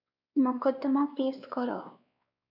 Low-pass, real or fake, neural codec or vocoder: 5.4 kHz; fake; codec, 16 kHz, 2 kbps, X-Codec, WavLM features, trained on Multilingual LibriSpeech